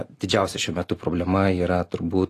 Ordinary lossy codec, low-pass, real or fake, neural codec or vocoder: AAC, 48 kbps; 14.4 kHz; real; none